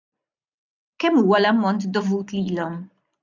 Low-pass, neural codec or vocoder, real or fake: 7.2 kHz; none; real